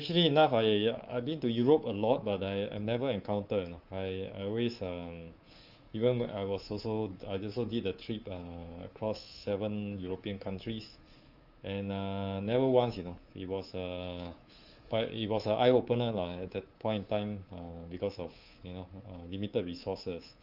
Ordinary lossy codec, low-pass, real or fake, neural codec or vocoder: Opus, 24 kbps; 5.4 kHz; fake; codec, 24 kHz, 3.1 kbps, DualCodec